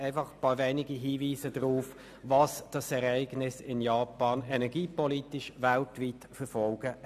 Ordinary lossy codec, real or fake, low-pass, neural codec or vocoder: none; real; 14.4 kHz; none